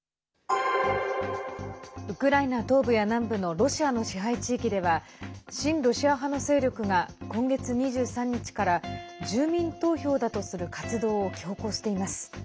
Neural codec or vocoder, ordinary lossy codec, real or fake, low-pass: none; none; real; none